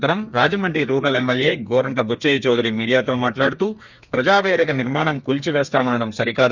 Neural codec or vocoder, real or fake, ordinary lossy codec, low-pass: codec, 32 kHz, 1.9 kbps, SNAC; fake; Opus, 64 kbps; 7.2 kHz